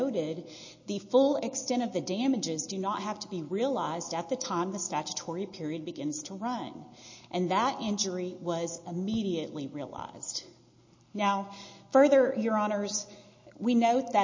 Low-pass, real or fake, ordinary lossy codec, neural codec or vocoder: 7.2 kHz; real; MP3, 32 kbps; none